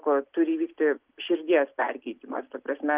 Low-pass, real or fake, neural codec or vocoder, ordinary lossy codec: 3.6 kHz; real; none; Opus, 32 kbps